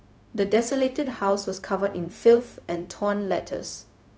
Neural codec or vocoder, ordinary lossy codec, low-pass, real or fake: codec, 16 kHz, 0.4 kbps, LongCat-Audio-Codec; none; none; fake